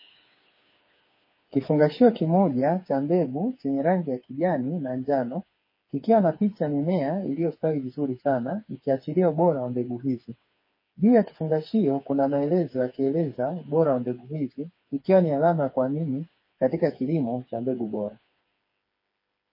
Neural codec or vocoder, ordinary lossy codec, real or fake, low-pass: codec, 16 kHz, 4 kbps, FreqCodec, smaller model; MP3, 24 kbps; fake; 5.4 kHz